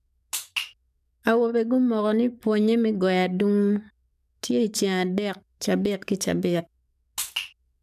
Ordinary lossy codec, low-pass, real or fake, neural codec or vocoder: none; 14.4 kHz; fake; codec, 44.1 kHz, 7.8 kbps, DAC